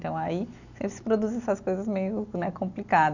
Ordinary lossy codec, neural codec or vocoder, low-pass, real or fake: none; none; 7.2 kHz; real